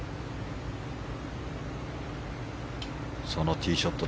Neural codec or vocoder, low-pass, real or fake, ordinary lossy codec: none; none; real; none